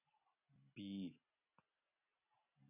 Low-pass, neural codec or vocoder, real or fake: 3.6 kHz; none; real